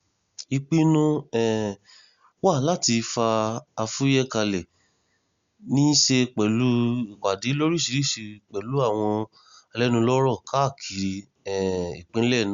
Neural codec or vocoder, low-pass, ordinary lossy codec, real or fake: none; 7.2 kHz; Opus, 64 kbps; real